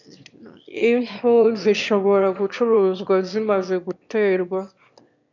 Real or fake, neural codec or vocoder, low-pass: fake; autoencoder, 22.05 kHz, a latent of 192 numbers a frame, VITS, trained on one speaker; 7.2 kHz